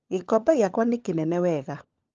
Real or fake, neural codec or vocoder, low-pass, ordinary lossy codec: fake; codec, 16 kHz, 16 kbps, FunCodec, trained on LibriTTS, 50 frames a second; 7.2 kHz; Opus, 24 kbps